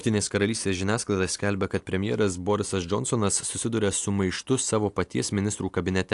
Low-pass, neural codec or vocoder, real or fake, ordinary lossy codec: 10.8 kHz; none; real; AAC, 64 kbps